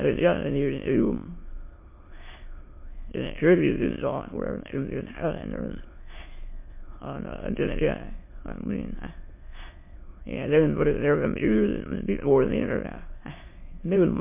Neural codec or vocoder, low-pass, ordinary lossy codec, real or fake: autoencoder, 22.05 kHz, a latent of 192 numbers a frame, VITS, trained on many speakers; 3.6 kHz; MP3, 24 kbps; fake